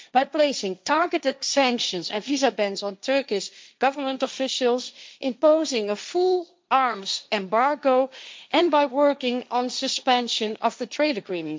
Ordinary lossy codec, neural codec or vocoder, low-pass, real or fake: none; codec, 16 kHz, 1.1 kbps, Voila-Tokenizer; none; fake